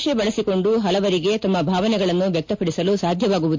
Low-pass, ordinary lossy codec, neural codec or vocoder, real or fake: 7.2 kHz; MP3, 48 kbps; none; real